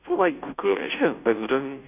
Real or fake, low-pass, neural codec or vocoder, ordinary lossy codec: fake; 3.6 kHz; codec, 16 kHz, 0.5 kbps, FunCodec, trained on Chinese and English, 25 frames a second; none